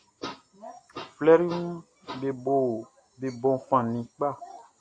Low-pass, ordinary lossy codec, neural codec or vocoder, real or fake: 9.9 kHz; AAC, 48 kbps; none; real